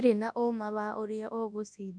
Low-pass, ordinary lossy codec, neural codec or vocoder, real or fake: 9.9 kHz; none; codec, 24 kHz, 0.9 kbps, WavTokenizer, large speech release; fake